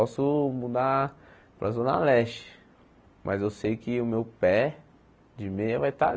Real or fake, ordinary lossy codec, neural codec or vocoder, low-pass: real; none; none; none